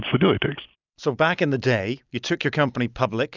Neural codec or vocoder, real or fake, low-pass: none; real; 7.2 kHz